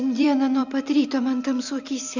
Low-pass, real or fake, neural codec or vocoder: 7.2 kHz; fake; vocoder, 44.1 kHz, 128 mel bands every 256 samples, BigVGAN v2